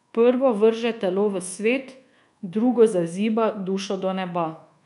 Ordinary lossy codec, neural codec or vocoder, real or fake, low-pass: none; codec, 24 kHz, 1.2 kbps, DualCodec; fake; 10.8 kHz